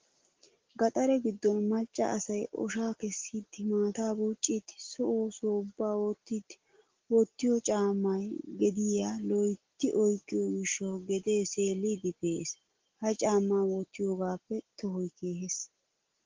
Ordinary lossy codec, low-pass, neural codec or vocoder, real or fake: Opus, 16 kbps; 7.2 kHz; none; real